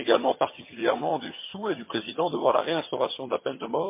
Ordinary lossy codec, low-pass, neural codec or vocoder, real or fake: MP3, 24 kbps; 3.6 kHz; vocoder, 22.05 kHz, 80 mel bands, HiFi-GAN; fake